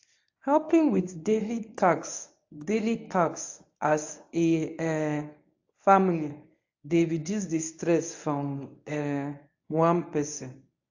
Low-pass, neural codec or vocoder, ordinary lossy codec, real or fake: 7.2 kHz; codec, 24 kHz, 0.9 kbps, WavTokenizer, medium speech release version 1; AAC, 48 kbps; fake